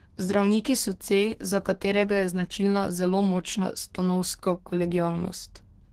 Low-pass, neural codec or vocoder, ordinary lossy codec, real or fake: 14.4 kHz; codec, 32 kHz, 1.9 kbps, SNAC; Opus, 16 kbps; fake